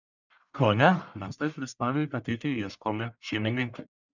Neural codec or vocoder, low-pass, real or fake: codec, 44.1 kHz, 1.7 kbps, Pupu-Codec; 7.2 kHz; fake